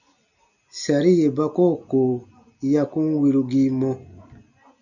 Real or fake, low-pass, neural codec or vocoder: real; 7.2 kHz; none